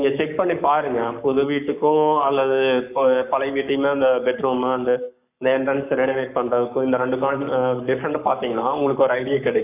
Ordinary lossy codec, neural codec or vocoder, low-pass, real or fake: none; codec, 44.1 kHz, 7.8 kbps, Pupu-Codec; 3.6 kHz; fake